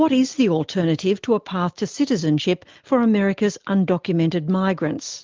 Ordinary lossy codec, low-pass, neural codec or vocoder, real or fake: Opus, 32 kbps; 7.2 kHz; none; real